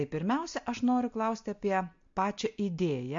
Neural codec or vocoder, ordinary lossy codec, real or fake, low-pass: none; MP3, 48 kbps; real; 7.2 kHz